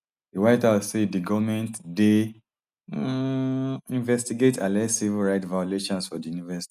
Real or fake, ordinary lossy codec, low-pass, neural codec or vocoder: real; none; 14.4 kHz; none